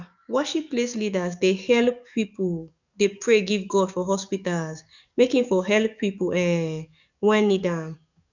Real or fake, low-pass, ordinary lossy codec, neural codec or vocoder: fake; 7.2 kHz; none; codec, 44.1 kHz, 7.8 kbps, DAC